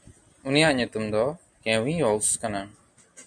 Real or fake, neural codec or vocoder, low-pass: real; none; 9.9 kHz